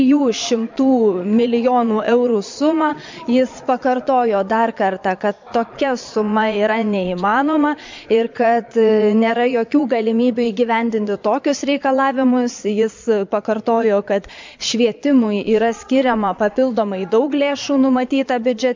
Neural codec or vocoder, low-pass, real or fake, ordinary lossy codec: vocoder, 22.05 kHz, 80 mel bands, Vocos; 7.2 kHz; fake; MP3, 64 kbps